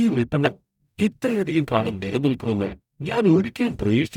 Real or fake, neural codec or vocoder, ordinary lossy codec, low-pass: fake; codec, 44.1 kHz, 0.9 kbps, DAC; none; 19.8 kHz